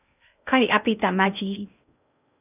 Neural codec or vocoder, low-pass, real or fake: codec, 16 kHz in and 24 kHz out, 0.6 kbps, FocalCodec, streaming, 2048 codes; 3.6 kHz; fake